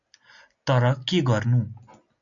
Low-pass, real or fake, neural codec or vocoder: 7.2 kHz; real; none